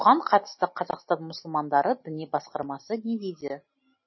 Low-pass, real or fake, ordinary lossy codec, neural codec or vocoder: 7.2 kHz; real; MP3, 24 kbps; none